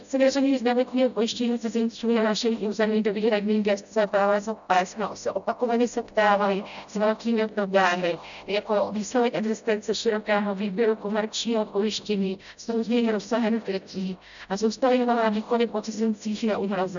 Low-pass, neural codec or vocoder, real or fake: 7.2 kHz; codec, 16 kHz, 0.5 kbps, FreqCodec, smaller model; fake